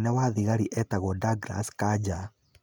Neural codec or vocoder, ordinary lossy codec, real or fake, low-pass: none; none; real; none